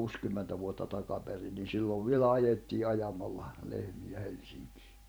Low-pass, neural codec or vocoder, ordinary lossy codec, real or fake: none; vocoder, 44.1 kHz, 128 mel bands every 512 samples, BigVGAN v2; none; fake